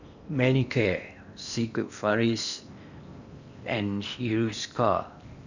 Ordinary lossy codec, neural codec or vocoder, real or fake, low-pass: none; codec, 16 kHz in and 24 kHz out, 0.8 kbps, FocalCodec, streaming, 65536 codes; fake; 7.2 kHz